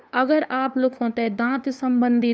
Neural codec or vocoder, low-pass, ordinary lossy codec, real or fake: codec, 16 kHz, 2 kbps, FunCodec, trained on LibriTTS, 25 frames a second; none; none; fake